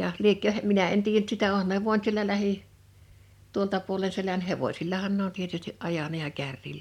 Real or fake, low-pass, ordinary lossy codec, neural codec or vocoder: real; 19.8 kHz; MP3, 96 kbps; none